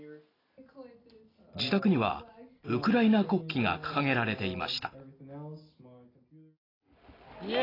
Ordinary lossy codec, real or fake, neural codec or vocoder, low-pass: AAC, 24 kbps; real; none; 5.4 kHz